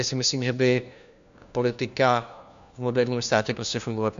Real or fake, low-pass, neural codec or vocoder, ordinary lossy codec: fake; 7.2 kHz; codec, 16 kHz, 1 kbps, FunCodec, trained on LibriTTS, 50 frames a second; MP3, 64 kbps